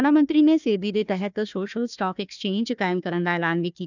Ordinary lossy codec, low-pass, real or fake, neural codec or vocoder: none; 7.2 kHz; fake; codec, 16 kHz, 1 kbps, FunCodec, trained on Chinese and English, 50 frames a second